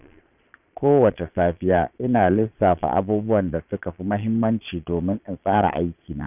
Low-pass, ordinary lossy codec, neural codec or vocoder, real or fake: 3.6 kHz; none; vocoder, 22.05 kHz, 80 mel bands, Vocos; fake